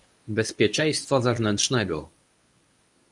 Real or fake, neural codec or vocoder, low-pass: fake; codec, 24 kHz, 0.9 kbps, WavTokenizer, medium speech release version 1; 10.8 kHz